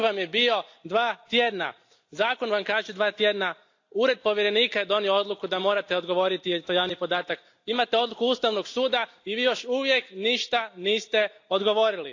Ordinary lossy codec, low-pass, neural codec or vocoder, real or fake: AAC, 48 kbps; 7.2 kHz; none; real